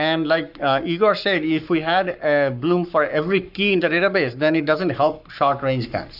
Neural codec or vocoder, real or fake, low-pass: codec, 44.1 kHz, 7.8 kbps, Pupu-Codec; fake; 5.4 kHz